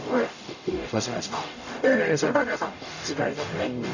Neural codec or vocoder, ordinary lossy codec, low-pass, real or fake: codec, 44.1 kHz, 0.9 kbps, DAC; none; 7.2 kHz; fake